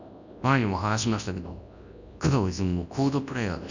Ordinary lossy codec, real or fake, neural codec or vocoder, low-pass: none; fake; codec, 24 kHz, 0.9 kbps, WavTokenizer, large speech release; 7.2 kHz